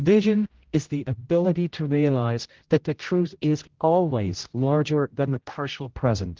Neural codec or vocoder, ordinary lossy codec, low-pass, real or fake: codec, 16 kHz, 0.5 kbps, X-Codec, HuBERT features, trained on general audio; Opus, 16 kbps; 7.2 kHz; fake